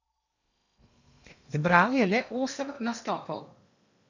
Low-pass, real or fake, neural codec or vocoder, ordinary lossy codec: 7.2 kHz; fake; codec, 16 kHz in and 24 kHz out, 0.8 kbps, FocalCodec, streaming, 65536 codes; none